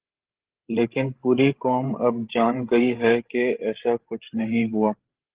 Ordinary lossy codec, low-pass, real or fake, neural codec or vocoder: Opus, 16 kbps; 3.6 kHz; fake; codec, 16 kHz, 16 kbps, FreqCodec, larger model